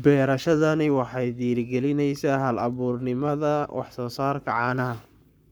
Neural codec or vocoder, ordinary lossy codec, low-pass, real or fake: codec, 44.1 kHz, 7.8 kbps, Pupu-Codec; none; none; fake